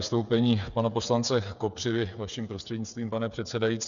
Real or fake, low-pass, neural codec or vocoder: fake; 7.2 kHz; codec, 16 kHz, 8 kbps, FreqCodec, smaller model